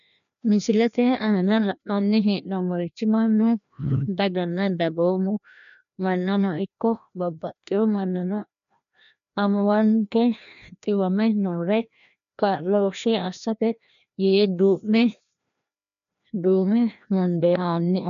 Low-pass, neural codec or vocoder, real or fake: 7.2 kHz; codec, 16 kHz, 1 kbps, FreqCodec, larger model; fake